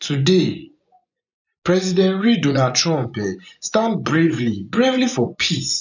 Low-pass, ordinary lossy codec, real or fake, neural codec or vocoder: 7.2 kHz; none; fake; vocoder, 24 kHz, 100 mel bands, Vocos